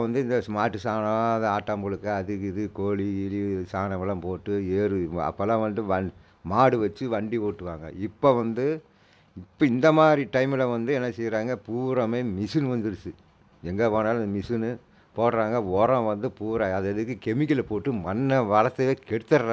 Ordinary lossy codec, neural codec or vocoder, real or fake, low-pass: none; none; real; none